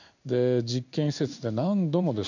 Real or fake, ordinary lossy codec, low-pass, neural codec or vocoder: fake; none; 7.2 kHz; codec, 16 kHz in and 24 kHz out, 1 kbps, XY-Tokenizer